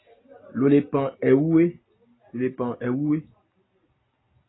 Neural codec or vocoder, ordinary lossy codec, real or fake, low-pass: none; AAC, 16 kbps; real; 7.2 kHz